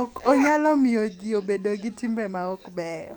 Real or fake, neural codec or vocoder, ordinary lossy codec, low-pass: fake; codec, 44.1 kHz, 7.8 kbps, DAC; none; 19.8 kHz